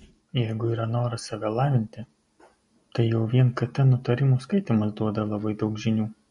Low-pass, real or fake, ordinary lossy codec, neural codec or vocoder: 14.4 kHz; real; MP3, 48 kbps; none